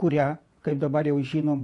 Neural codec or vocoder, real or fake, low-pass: vocoder, 24 kHz, 100 mel bands, Vocos; fake; 10.8 kHz